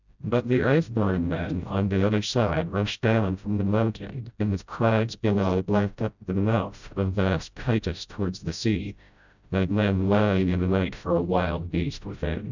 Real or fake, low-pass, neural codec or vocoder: fake; 7.2 kHz; codec, 16 kHz, 0.5 kbps, FreqCodec, smaller model